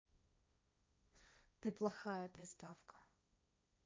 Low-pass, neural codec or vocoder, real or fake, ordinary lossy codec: none; codec, 16 kHz, 1.1 kbps, Voila-Tokenizer; fake; none